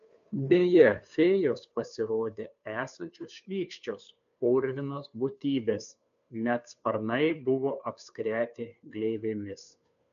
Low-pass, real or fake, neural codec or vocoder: 7.2 kHz; fake; codec, 16 kHz, 2 kbps, FunCodec, trained on Chinese and English, 25 frames a second